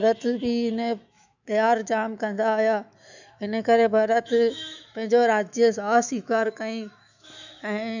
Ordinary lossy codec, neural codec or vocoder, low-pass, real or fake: none; autoencoder, 48 kHz, 128 numbers a frame, DAC-VAE, trained on Japanese speech; 7.2 kHz; fake